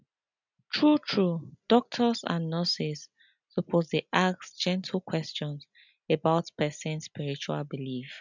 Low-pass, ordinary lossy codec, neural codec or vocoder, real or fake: 7.2 kHz; none; none; real